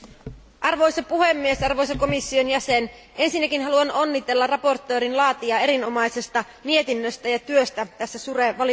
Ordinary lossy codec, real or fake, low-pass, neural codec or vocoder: none; real; none; none